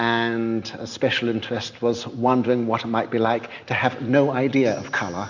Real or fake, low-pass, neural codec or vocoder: real; 7.2 kHz; none